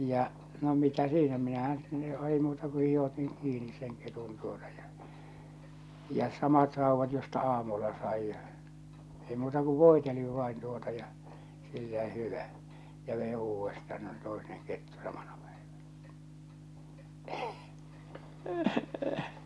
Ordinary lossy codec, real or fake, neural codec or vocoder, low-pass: none; real; none; none